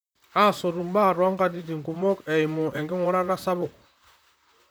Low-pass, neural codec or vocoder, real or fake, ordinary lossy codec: none; vocoder, 44.1 kHz, 128 mel bands, Pupu-Vocoder; fake; none